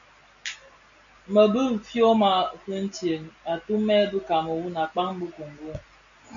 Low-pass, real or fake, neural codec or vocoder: 7.2 kHz; real; none